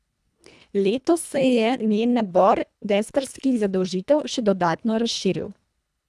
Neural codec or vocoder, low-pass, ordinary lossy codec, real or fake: codec, 24 kHz, 1.5 kbps, HILCodec; none; none; fake